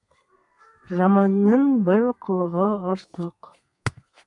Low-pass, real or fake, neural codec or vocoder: 10.8 kHz; fake; codec, 32 kHz, 1.9 kbps, SNAC